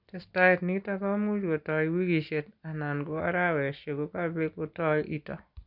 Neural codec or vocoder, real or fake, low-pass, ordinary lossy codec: none; real; 5.4 kHz; none